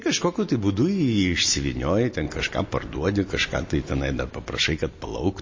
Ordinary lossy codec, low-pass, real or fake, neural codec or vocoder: MP3, 32 kbps; 7.2 kHz; real; none